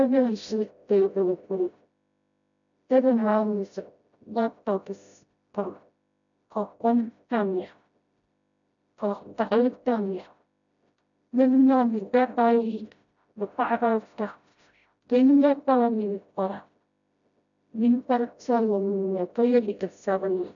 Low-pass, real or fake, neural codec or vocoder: 7.2 kHz; fake; codec, 16 kHz, 0.5 kbps, FreqCodec, smaller model